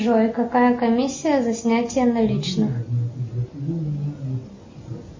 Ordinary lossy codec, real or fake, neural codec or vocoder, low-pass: MP3, 32 kbps; real; none; 7.2 kHz